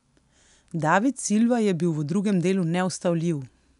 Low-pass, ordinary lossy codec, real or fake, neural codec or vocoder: 10.8 kHz; none; real; none